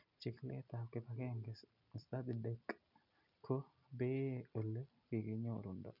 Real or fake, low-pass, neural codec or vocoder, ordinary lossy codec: real; 5.4 kHz; none; none